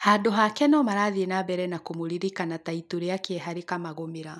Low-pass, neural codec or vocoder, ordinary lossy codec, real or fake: none; none; none; real